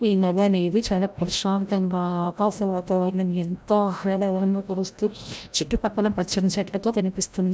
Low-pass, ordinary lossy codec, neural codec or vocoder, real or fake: none; none; codec, 16 kHz, 0.5 kbps, FreqCodec, larger model; fake